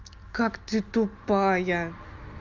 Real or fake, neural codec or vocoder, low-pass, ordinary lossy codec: real; none; 7.2 kHz; Opus, 24 kbps